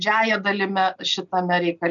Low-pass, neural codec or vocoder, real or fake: 7.2 kHz; none; real